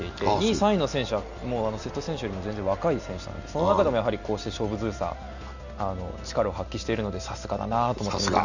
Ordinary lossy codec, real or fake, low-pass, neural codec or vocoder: none; real; 7.2 kHz; none